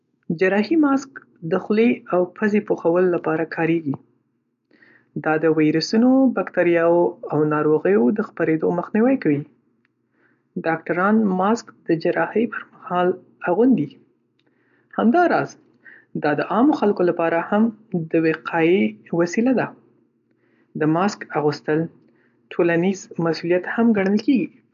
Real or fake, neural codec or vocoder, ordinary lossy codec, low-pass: real; none; none; 7.2 kHz